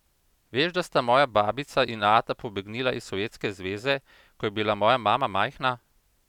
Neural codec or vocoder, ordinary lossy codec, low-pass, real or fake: none; none; 19.8 kHz; real